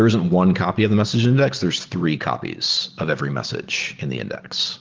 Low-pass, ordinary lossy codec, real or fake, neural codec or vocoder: 7.2 kHz; Opus, 16 kbps; real; none